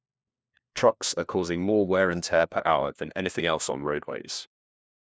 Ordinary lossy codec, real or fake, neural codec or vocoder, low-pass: none; fake; codec, 16 kHz, 1 kbps, FunCodec, trained on LibriTTS, 50 frames a second; none